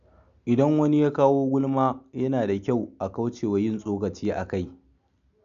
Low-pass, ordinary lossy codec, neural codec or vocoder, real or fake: 7.2 kHz; none; none; real